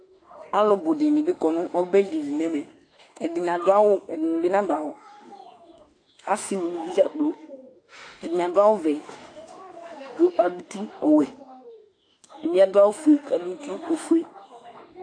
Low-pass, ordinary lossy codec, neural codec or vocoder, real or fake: 9.9 kHz; AAC, 48 kbps; autoencoder, 48 kHz, 32 numbers a frame, DAC-VAE, trained on Japanese speech; fake